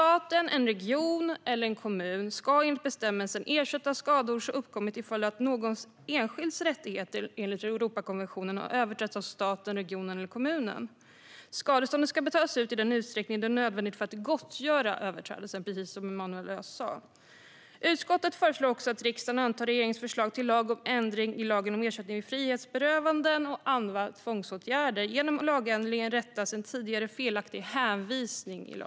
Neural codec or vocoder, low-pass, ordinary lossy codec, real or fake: none; none; none; real